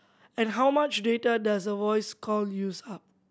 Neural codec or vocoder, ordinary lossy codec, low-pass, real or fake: none; none; none; real